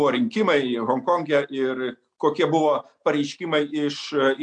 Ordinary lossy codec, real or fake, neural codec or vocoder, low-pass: MP3, 64 kbps; real; none; 9.9 kHz